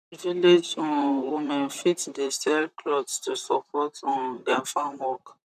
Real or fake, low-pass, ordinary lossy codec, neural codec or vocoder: fake; 14.4 kHz; none; vocoder, 44.1 kHz, 128 mel bands, Pupu-Vocoder